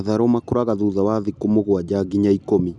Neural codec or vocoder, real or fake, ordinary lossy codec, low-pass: none; real; none; 10.8 kHz